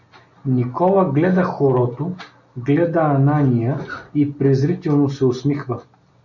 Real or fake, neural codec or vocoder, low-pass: real; none; 7.2 kHz